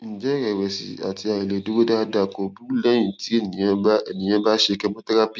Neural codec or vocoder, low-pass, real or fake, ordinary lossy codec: none; none; real; none